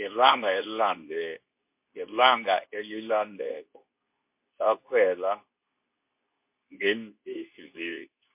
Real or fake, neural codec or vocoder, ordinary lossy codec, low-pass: fake; codec, 24 kHz, 0.9 kbps, WavTokenizer, medium speech release version 2; MP3, 32 kbps; 3.6 kHz